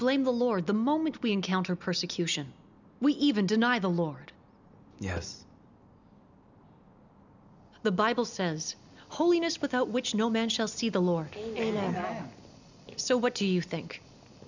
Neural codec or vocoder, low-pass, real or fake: none; 7.2 kHz; real